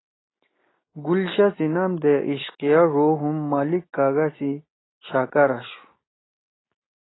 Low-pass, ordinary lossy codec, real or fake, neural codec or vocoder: 7.2 kHz; AAC, 16 kbps; real; none